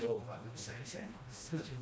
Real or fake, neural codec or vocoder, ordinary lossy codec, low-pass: fake; codec, 16 kHz, 1 kbps, FreqCodec, smaller model; none; none